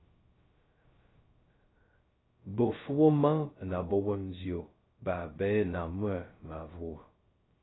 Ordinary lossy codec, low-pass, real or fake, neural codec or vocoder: AAC, 16 kbps; 7.2 kHz; fake; codec, 16 kHz, 0.2 kbps, FocalCodec